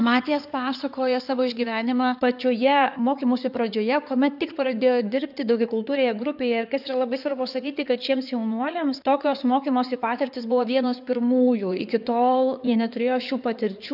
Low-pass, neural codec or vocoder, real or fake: 5.4 kHz; codec, 16 kHz in and 24 kHz out, 2.2 kbps, FireRedTTS-2 codec; fake